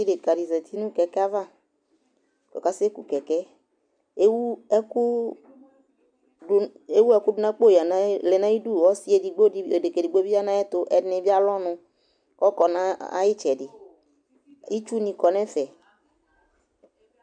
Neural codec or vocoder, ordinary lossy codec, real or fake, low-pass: none; MP3, 96 kbps; real; 9.9 kHz